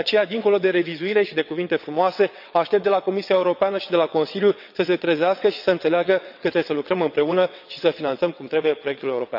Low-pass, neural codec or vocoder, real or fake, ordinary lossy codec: 5.4 kHz; vocoder, 22.05 kHz, 80 mel bands, WaveNeXt; fake; none